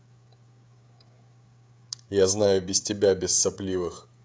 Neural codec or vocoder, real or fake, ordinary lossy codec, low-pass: codec, 16 kHz, 16 kbps, FreqCodec, smaller model; fake; none; none